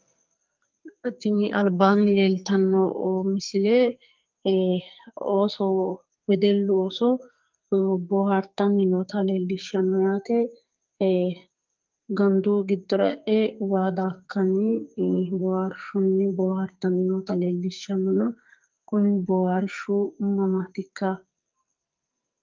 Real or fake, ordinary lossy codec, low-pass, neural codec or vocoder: fake; Opus, 24 kbps; 7.2 kHz; codec, 44.1 kHz, 2.6 kbps, SNAC